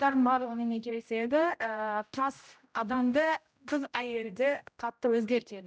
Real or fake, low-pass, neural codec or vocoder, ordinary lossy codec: fake; none; codec, 16 kHz, 0.5 kbps, X-Codec, HuBERT features, trained on general audio; none